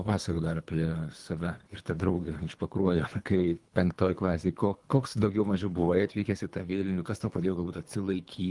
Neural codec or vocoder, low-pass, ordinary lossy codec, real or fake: codec, 24 kHz, 3 kbps, HILCodec; 10.8 kHz; Opus, 32 kbps; fake